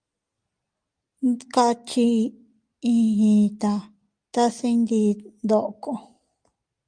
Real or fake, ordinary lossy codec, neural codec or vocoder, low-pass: real; Opus, 32 kbps; none; 9.9 kHz